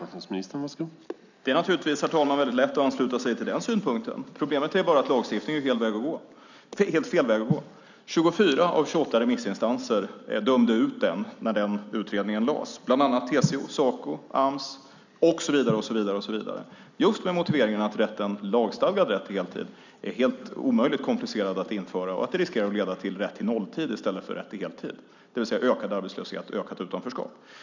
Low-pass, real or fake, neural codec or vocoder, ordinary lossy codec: 7.2 kHz; real; none; none